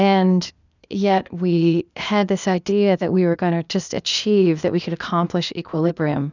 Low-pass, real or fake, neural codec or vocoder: 7.2 kHz; fake; codec, 16 kHz, 0.8 kbps, ZipCodec